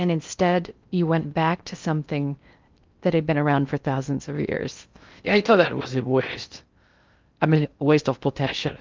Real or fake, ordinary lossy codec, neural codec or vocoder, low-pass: fake; Opus, 24 kbps; codec, 16 kHz in and 24 kHz out, 0.6 kbps, FocalCodec, streaming, 4096 codes; 7.2 kHz